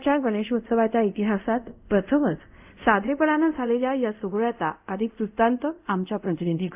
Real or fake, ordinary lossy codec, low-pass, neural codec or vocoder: fake; AAC, 32 kbps; 3.6 kHz; codec, 24 kHz, 0.5 kbps, DualCodec